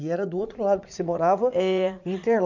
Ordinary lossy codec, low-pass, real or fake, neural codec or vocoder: none; 7.2 kHz; fake; vocoder, 44.1 kHz, 80 mel bands, Vocos